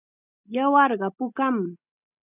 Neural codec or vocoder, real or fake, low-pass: none; real; 3.6 kHz